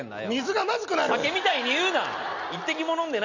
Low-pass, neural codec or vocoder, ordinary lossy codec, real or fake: 7.2 kHz; none; none; real